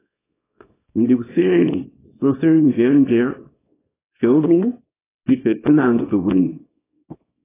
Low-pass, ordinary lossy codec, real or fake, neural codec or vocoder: 3.6 kHz; AAC, 16 kbps; fake; codec, 24 kHz, 0.9 kbps, WavTokenizer, small release